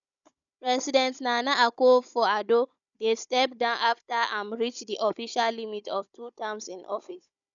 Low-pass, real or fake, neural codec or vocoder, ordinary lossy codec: 7.2 kHz; fake; codec, 16 kHz, 16 kbps, FunCodec, trained on Chinese and English, 50 frames a second; none